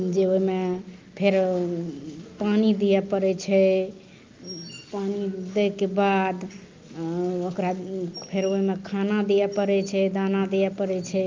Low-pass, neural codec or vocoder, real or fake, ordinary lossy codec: 7.2 kHz; none; real; Opus, 24 kbps